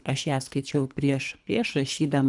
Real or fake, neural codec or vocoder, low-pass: fake; codec, 24 kHz, 3 kbps, HILCodec; 10.8 kHz